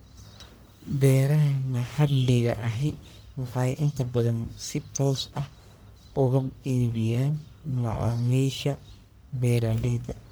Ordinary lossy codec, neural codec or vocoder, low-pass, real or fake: none; codec, 44.1 kHz, 1.7 kbps, Pupu-Codec; none; fake